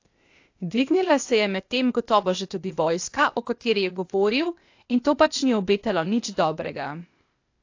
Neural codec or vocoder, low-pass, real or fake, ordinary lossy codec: codec, 16 kHz, 0.8 kbps, ZipCodec; 7.2 kHz; fake; AAC, 48 kbps